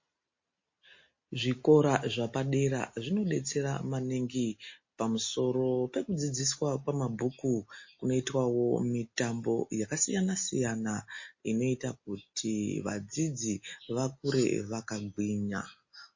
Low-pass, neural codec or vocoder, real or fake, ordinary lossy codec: 7.2 kHz; none; real; MP3, 32 kbps